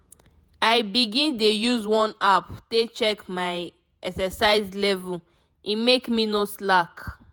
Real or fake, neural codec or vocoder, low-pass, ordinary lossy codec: fake; vocoder, 48 kHz, 128 mel bands, Vocos; none; none